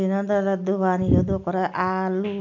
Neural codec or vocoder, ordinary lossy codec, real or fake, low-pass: none; none; real; 7.2 kHz